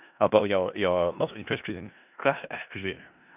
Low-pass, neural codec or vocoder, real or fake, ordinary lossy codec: 3.6 kHz; codec, 16 kHz in and 24 kHz out, 0.4 kbps, LongCat-Audio-Codec, four codebook decoder; fake; none